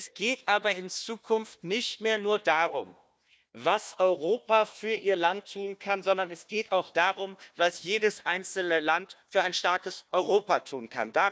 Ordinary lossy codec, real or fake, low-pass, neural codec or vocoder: none; fake; none; codec, 16 kHz, 1 kbps, FunCodec, trained on Chinese and English, 50 frames a second